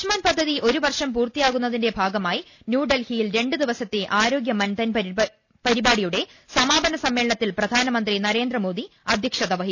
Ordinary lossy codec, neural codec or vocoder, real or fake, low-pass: none; none; real; 7.2 kHz